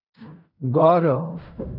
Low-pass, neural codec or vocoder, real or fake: 5.4 kHz; codec, 16 kHz in and 24 kHz out, 0.4 kbps, LongCat-Audio-Codec, fine tuned four codebook decoder; fake